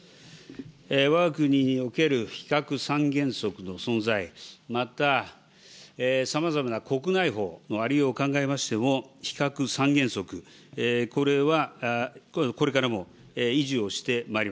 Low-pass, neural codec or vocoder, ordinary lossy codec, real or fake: none; none; none; real